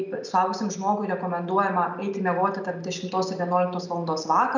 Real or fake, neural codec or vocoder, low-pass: real; none; 7.2 kHz